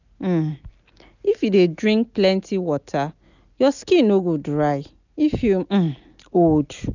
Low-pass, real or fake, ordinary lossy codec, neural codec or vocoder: 7.2 kHz; real; none; none